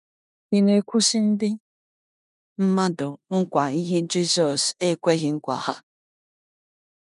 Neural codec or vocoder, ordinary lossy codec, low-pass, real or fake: codec, 16 kHz in and 24 kHz out, 0.9 kbps, LongCat-Audio-Codec, four codebook decoder; none; 10.8 kHz; fake